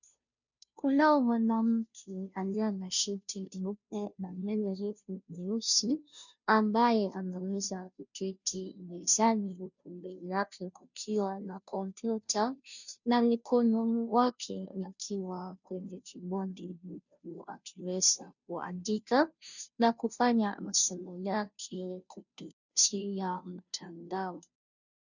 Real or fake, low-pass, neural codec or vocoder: fake; 7.2 kHz; codec, 16 kHz, 0.5 kbps, FunCodec, trained on Chinese and English, 25 frames a second